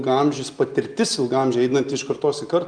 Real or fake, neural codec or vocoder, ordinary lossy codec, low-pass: real; none; Opus, 32 kbps; 9.9 kHz